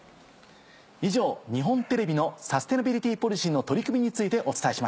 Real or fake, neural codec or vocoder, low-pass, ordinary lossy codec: real; none; none; none